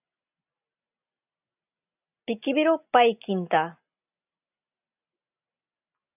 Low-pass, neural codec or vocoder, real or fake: 3.6 kHz; none; real